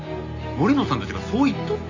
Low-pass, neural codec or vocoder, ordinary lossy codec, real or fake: 7.2 kHz; none; AAC, 48 kbps; real